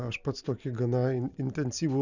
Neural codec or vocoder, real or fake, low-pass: none; real; 7.2 kHz